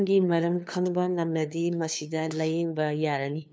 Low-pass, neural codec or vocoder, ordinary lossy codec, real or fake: none; codec, 16 kHz, 2 kbps, FunCodec, trained on LibriTTS, 25 frames a second; none; fake